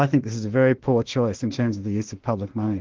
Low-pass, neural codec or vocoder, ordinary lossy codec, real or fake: 7.2 kHz; autoencoder, 48 kHz, 32 numbers a frame, DAC-VAE, trained on Japanese speech; Opus, 16 kbps; fake